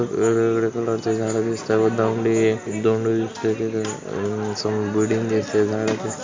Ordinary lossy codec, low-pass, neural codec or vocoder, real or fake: MP3, 48 kbps; 7.2 kHz; none; real